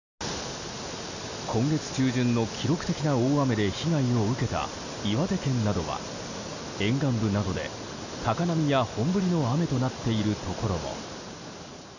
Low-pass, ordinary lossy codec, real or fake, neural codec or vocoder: 7.2 kHz; none; real; none